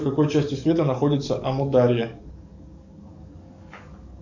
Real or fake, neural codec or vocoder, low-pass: fake; codec, 44.1 kHz, 7.8 kbps, DAC; 7.2 kHz